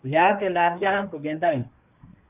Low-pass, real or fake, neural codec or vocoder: 3.6 kHz; fake; codec, 24 kHz, 1 kbps, SNAC